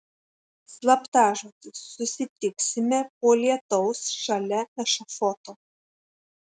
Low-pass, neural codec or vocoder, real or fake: 9.9 kHz; none; real